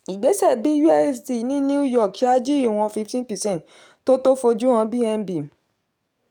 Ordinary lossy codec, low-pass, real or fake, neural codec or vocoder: none; 19.8 kHz; fake; codec, 44.1 kHz, 7.8 kbps, DAC